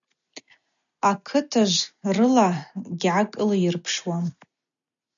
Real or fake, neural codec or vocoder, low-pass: real; none; 7.2 kHz